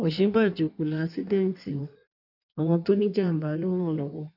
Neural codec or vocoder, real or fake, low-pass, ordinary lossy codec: codec, 16 kHz in and 24 kHz out, 1.1 kbps, FireRedTTS-2 codec; fake; 5.4 kHz; none